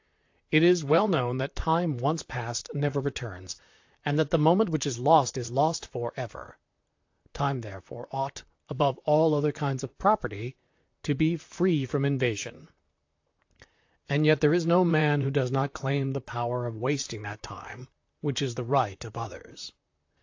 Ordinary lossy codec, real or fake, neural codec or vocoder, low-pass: AAC, 48 kbps; fake; vocoder, 44.1 kHz, 128 mel bands, Pupu-Vocoder; 7.2 kHz